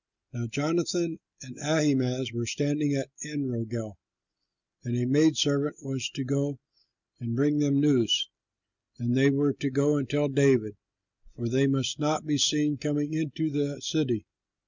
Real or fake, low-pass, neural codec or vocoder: real; 7.2 kHz; none